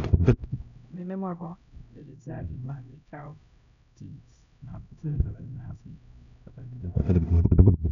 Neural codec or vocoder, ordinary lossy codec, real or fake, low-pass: codec, 16 kHz, 0.5 kbps, X-Codec, HuBERT features, trained on LibriSpeech; none; fake; 7.2 kHz